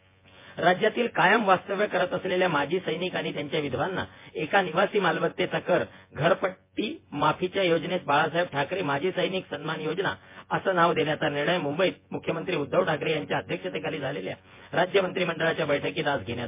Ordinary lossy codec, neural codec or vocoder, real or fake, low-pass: MP3, 24 kbps; vocoder, 24 kHz, 100 mel bands, Vocos; fake; 3.6 kHz